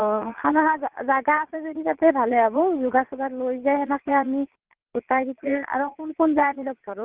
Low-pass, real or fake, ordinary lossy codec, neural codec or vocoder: 3.6 kHz; fake; Opus, 16 kbps; vocoder, 22.05 kHz, 80 mel bands, Vocos